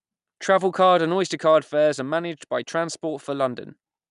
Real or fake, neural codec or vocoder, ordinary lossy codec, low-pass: real; none; none; 10.8 kHz